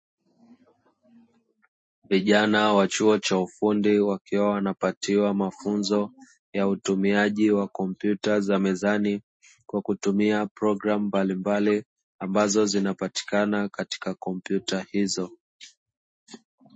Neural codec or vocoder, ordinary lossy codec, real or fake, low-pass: none; MP3, 32 kbps; real; 9.9 kHz